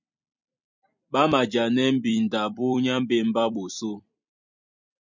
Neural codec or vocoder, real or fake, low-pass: vocoder, 44.1 kHz, 128 mel bands every 256 samples, BigVGAN v2; fake; 7.2 kHz